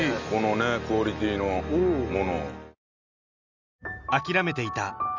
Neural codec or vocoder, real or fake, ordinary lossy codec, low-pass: none; real; none; 7.2 kHz